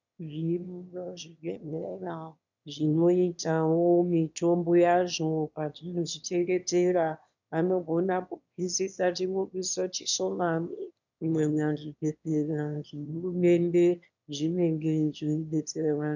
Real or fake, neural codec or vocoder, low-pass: fake; autoencoder, 22.05 kHz, a latent of 192 numbers a frame, VITS, trained on one speaker; 7.2 kHz